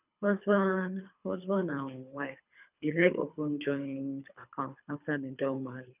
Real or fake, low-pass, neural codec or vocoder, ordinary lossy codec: fake; 3.6 kHz; codec, 24 kHz, 3 kbps, HILCodec; none